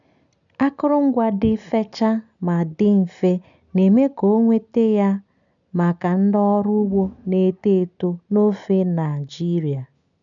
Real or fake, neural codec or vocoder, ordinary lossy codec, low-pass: real; none; none; 7.2 kHz